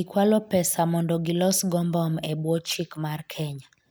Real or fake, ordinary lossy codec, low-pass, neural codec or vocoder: real; none; none; none